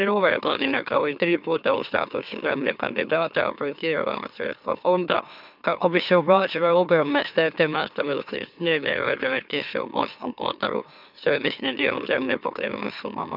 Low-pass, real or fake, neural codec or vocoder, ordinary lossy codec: 5.4 kHz; fake; autoencoder, 44.1 kHz, a latent of 192 numbers a frame, MeloTTS; none